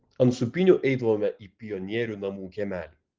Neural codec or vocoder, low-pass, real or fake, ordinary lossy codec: none; 7.2 kHz; real; Opus, 16 kbps